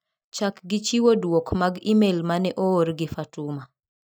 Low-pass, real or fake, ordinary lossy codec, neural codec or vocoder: none; real; none; none